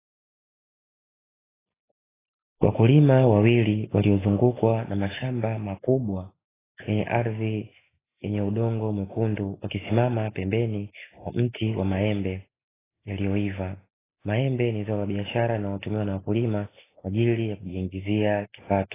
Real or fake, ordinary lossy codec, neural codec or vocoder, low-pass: real; AAC, 16 kbps; none; 3.6 kHz